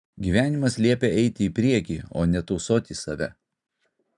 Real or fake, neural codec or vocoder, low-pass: real; none; 10.8 kHz